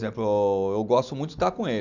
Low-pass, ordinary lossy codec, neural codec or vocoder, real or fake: 7.2 kHz; none; none; real